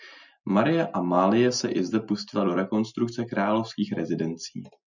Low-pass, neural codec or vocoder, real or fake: 7.2 kHz; none; real